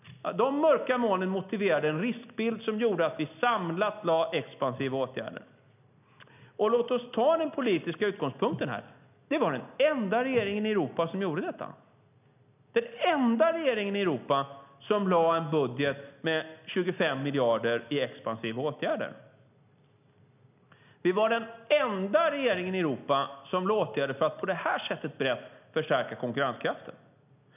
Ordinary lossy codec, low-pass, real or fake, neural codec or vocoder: none; 3.6 kHz; real; none